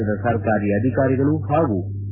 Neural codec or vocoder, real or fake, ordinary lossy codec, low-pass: none; real; MP3, 16 kbps; 3.6 kHz